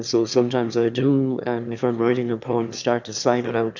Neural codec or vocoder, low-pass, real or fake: autoencoder, 22.05 kHz, a latent of 192 numbers a frame, VITS, trained on one speaker; 7.2 kHz; fake